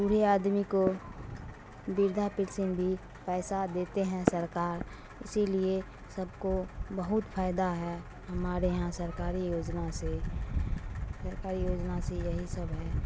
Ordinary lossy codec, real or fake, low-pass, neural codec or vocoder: none; real; none; none